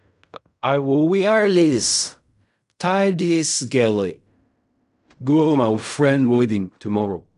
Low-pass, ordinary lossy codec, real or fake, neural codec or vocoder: 10.8 kHz; none; fake; codec, 16 kHz in and 24 kHz out, 0.4 kbps, LongCat-Audio-Codec, fine tuned four codebook decoder